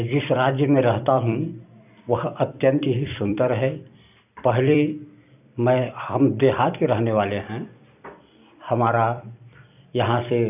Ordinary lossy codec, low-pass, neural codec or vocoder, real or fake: none; 3.6 kHz; vocoder, 44.1 kHz, 128 mel bands every 256 samples, BigVGAN v2; fake